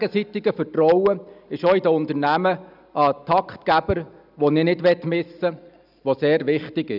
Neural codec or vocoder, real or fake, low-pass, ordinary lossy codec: none; real; 5.4 kHz; none